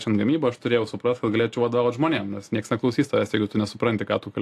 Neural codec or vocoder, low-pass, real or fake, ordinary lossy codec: none; 14.4 kHz; real; AAC, 64 kbps